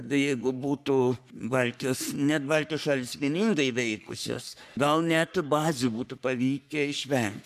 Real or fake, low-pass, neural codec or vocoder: fake; 14.4 kHz; codec, 44.1 kHz, 3.4 kbps, Pupu-Codec